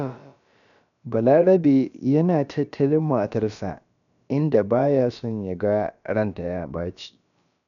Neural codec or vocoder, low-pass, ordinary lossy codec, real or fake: codec, 16 kHz, about 1 kbps, DyCAST, with the encoder's durations; 7.2 kHz; none; fake